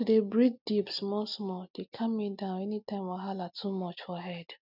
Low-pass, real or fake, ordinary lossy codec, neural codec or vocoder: 5.4 kHz; real; none; none